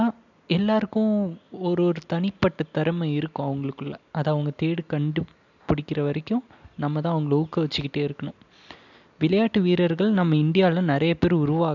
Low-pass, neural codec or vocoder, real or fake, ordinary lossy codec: 7.2 kHz; none; real; none